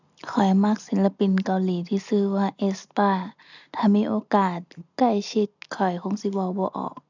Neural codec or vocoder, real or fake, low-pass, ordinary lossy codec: none; real; 7.2 kHz; none